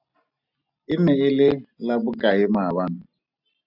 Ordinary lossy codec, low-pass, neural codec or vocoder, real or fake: AAC, 48 kbps; 5.4 kHz; none; real